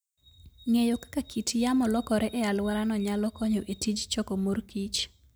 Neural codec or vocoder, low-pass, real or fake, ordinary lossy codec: none; none; real; none